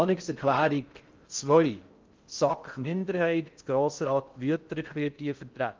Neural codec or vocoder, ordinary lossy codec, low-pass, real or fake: codec, 16 kHz in and 24 kHz out, 0.6 kbps, FocalCodec, streaming, 4096 codes; Opus, 32 kbps; 7.2 kHz; fake